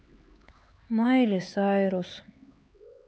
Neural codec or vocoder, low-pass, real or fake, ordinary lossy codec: codec, 16 kHz, 4 kbps, X-Codec, HuBERT features, trained on LibriSpeech; none; fake; none